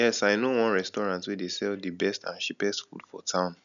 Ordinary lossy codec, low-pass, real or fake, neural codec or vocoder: none; 7.2 kHz; real; none